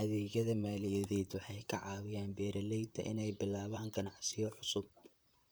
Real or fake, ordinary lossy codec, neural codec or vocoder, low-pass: fake; none; vocoder, 44.1 kHz, 128 mel bands, Pupu-Vocoder; none